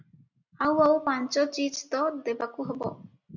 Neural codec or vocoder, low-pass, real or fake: none; 7.2 kHz; real